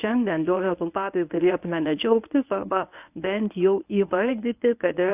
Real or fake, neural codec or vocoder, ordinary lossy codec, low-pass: fake; codec, 24 kHz, 0.9 kbps, WavTokenizer, medium speech release version 1; AAC, 32 kbps; 3.6 kHz